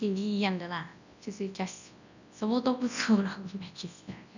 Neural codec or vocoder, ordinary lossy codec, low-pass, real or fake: codec, 24 kHz, 0.9 kbps, WavTokenizer, large speech release; none; 7.2 kHz; fake